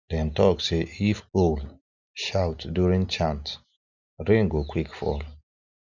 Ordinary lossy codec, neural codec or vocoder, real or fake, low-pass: none; none; real; 7.2 kHz